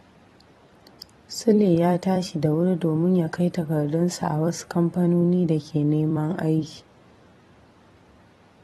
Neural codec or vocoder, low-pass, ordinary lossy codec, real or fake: vocoder, 44.1 kHz, 128 mel bands every 256 samples, BigVGAN v2; 19.8 kHz; AAC, 32 kbps; fake